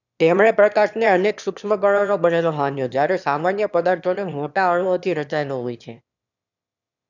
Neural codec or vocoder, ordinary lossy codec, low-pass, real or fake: autoencoder, 22.05 kHz, a latent of 192 numbers a frame, VITS, trained on one speaker; none; 7.2 kHz; fake